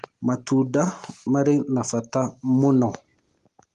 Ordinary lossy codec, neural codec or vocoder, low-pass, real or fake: Opus, 32 kbps; none; 10.8 kHz; real